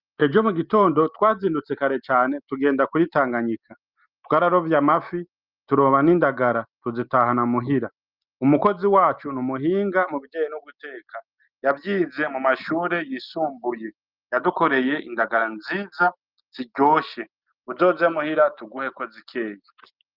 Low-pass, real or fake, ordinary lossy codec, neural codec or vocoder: 5.4 kHz; real; Opus, 24 kbps; none